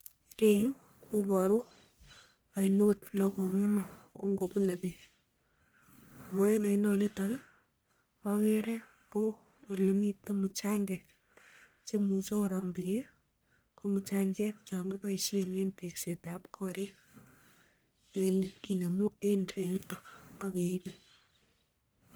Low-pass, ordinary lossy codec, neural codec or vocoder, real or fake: none; none; codec, 44.1 kHz, 1.7 kbps, Pupu-Codec; fake